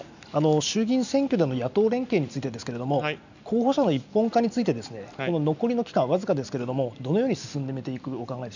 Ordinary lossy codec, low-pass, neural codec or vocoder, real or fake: none; 7.2 kHz; none; real